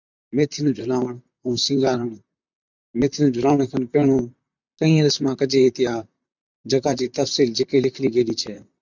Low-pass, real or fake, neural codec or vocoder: 7.2 kHz; fake; vocoder, 44.1 kHz, 128 mel bands, Pupu-Vocoder